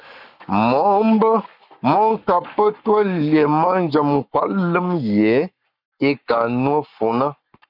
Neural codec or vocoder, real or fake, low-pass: codec, 44.1 kHz, 7.8 kbps, Pupu-Codec; fake; 5.4 kHz